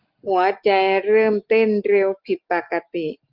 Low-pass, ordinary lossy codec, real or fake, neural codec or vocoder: 5.4 kHz; Opus, 32 kbps; real; none